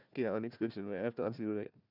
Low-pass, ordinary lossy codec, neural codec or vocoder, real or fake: 5.4 kHz; none; codec, 16 kHz, 1 kbps, FunCodec, trained on Chinese and English, 50 frames a second; fake